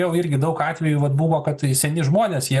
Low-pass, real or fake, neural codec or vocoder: 14.4 kHz; real; none